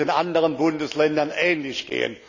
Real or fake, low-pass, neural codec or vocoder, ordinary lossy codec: real; 7.2 kHz; none; none